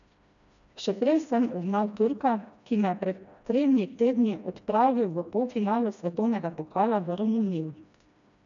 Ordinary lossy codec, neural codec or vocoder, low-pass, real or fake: none; codec, 16 kHz, 1 kbps, FreqCodec, smaller model; 7.2 kHz; fake